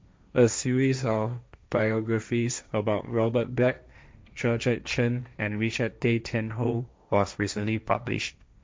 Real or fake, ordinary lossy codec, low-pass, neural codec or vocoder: fake; none; none; codec, 16 kHz, 1.1 kbps, Voila-Tokenizer